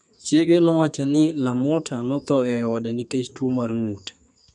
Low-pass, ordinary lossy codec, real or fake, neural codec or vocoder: 10.8 kHz; none; fake; codec, 32 kHz, 1.9 kbps, SNAC